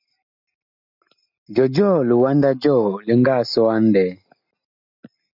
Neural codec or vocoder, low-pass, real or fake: none; 5.4 kHz; real